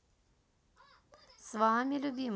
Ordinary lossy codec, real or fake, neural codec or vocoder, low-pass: none; real; none; none